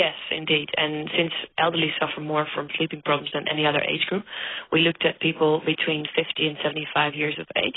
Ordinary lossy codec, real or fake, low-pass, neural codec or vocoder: AAC, 16 kbps; real; 7.2 kHz; none